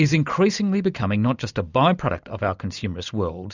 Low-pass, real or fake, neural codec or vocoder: 7.2 kHz; real; none